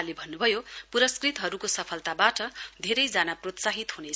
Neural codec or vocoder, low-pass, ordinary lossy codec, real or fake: none; none; none; real